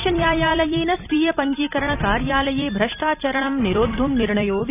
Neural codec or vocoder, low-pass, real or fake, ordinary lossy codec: vocoder, 44.1 kHz, 128 mel bands every 256 samples, BigVGAN v2; 3.6 kHz; fake; none